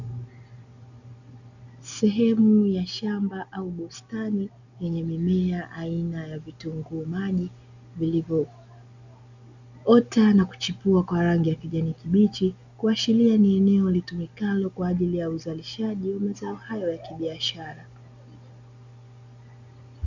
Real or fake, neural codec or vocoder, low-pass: real; none; 7.2 kHz